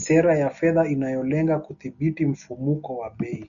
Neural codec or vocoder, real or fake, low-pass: none; real; 7.2 kHz